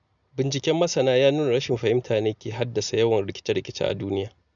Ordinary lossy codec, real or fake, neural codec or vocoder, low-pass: none; real; none; 7.2 kHz